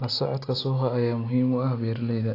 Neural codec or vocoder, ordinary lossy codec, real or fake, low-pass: none; AAC, 24 kbps; real; 5.4 kHz